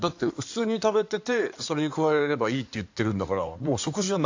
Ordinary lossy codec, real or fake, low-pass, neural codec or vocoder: AAC, 48 kbps; fake; 7.2 kHz; codec, 16 kHz in and 24 kHz out, 2.2 kbps, FireRedTTS-2 codec